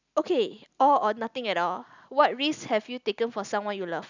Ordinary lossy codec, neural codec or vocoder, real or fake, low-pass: none; none; real; 7.2 kHz